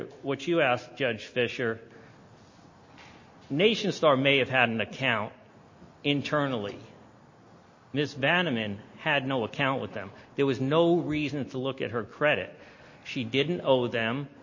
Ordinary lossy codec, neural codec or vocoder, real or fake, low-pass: MP3, 32 kbps; none; real; 7.2 kHz